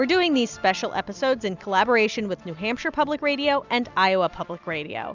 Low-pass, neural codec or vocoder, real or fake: 7.2 kHz; none; real